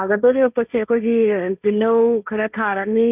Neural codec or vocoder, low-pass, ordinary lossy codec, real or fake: codec, 16 kHz, 1.1 kbps, Voila-Tokenizer; 3.6 kHz; none; fake